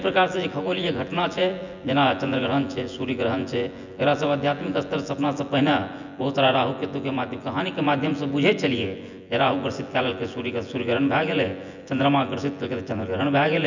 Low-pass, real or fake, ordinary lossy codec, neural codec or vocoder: 7.2 kHz; fake; none; vocoder, 24 kHz, 100 mel bands, Vocos